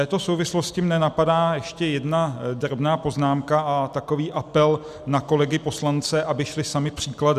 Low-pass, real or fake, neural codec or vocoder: 14.4 kHz; real; none